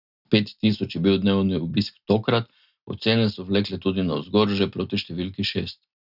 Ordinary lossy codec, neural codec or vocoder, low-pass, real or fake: none; none; 5.4 kHz; real